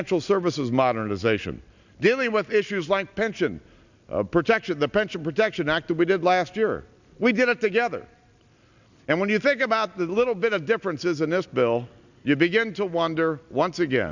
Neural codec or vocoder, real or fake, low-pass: none; real; 7.2 kHz